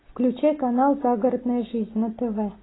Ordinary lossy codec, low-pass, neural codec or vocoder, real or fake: AAC, 16 kbps; 7.2 kHz; none; real